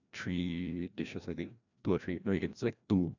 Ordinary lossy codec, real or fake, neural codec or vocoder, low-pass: none; fake; codec, 16 kHz, 1 kbps, FreqCodec, larger model; 7.2 kHz